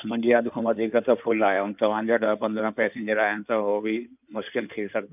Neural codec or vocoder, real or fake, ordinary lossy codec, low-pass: codec, 16 kHz in and 24 kHz out, 2.2 kbps, FireRedTTS-2 codec; fake; none; 3.6 kHz